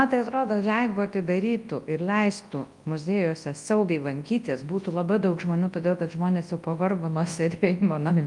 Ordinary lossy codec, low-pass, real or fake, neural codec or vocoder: Opus, 32 kbps; 10.8 kHz; fake; codec, 24 kHz, 0.9 kbps, WavTokenizer, large speech release